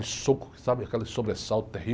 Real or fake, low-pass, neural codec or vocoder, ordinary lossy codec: real; none; none; none